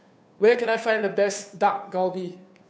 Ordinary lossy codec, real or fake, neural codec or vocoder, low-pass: none; fake; codec, 16 kHz, 2 kbps, FunCodec, trained on Chinese and English, 25 frames a second; none